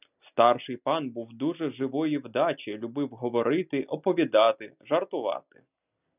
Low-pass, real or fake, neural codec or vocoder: 3.6 kHz; real; none